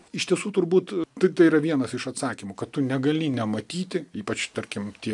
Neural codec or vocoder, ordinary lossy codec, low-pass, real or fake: none; MP3, 96 kbps; 10.8 kHz; real